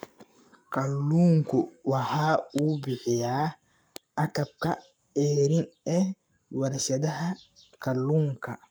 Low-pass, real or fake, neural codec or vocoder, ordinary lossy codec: none; fake; codec, 44.1 kHz, 7.8 kbps, Pupu-Codec; none